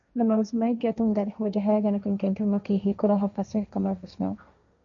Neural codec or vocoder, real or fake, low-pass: codec, 16 kHz, 1.1 kbps, Voila-Tokenizer; fake; 7.2 kHz